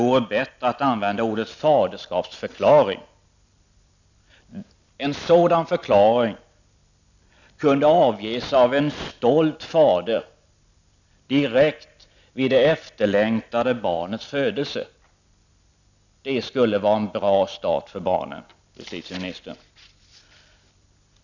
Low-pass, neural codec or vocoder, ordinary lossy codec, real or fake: 7.2 kHz; none; none; real